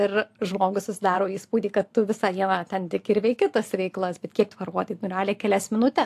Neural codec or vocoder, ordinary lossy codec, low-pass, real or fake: vocoder, 44.1 kHz, 128 mel bands every 512 samples, BigVGAN v2; AAC, 64 kbps; 14.4 kHz; fake